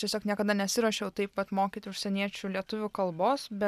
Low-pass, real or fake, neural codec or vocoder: 14.4 kHz; real; none